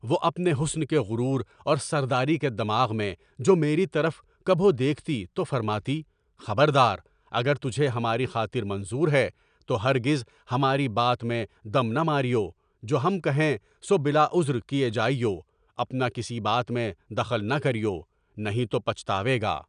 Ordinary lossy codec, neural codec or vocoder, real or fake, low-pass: none; none; real; 9.9 kHz